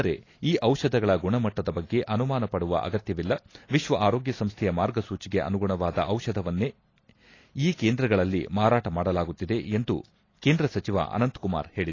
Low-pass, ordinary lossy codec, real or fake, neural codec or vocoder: 7.2 kHz; AAC, 32 kbps; real; none